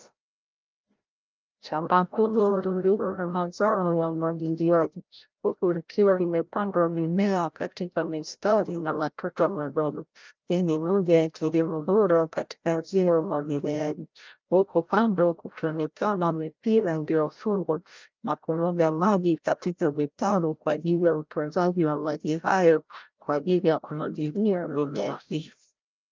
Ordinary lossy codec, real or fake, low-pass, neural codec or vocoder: Opus, 24 kbps; fake; 7.2 kHz; codec, 16 kHz, 0.5 kbps, FreqCodec, larger model